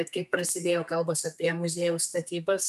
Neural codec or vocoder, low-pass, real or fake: codec, 32 kHz, 1.9 kbps, SNAC; 14.4 kHz; fake